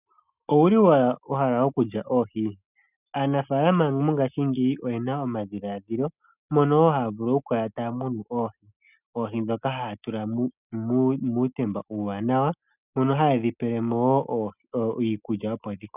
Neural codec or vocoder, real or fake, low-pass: none; real; 3.6 kHz